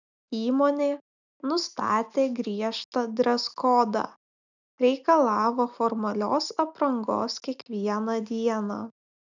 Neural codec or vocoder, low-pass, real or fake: none; 7.2 kHz; real